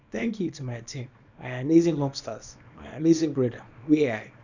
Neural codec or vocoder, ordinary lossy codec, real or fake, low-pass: codec, 24 kHz, 0.9 kbps, WavTokenizer, small release; none; fake; 7.2 kHz